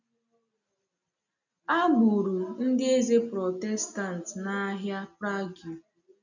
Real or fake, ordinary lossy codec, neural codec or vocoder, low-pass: real; none; none; 7.2 kHz